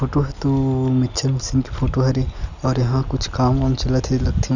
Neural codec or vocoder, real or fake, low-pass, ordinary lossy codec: none; real; 7.2 kHz; none